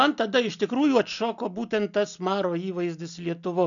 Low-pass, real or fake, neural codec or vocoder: 7.2 kHz; real; none